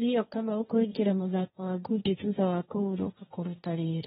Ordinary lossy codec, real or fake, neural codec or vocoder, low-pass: AAC, 16 kbps; fake; codec, 16 kHz, 1.1 kbps, Voila-Tokenizer; 7.2 kHz